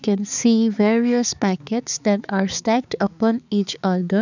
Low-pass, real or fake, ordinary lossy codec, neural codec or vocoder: 7.2 kHz; fake; none; codec, 16 kHz, 4 kbps, X-Codec, HuBERT features, trained on balanced general audio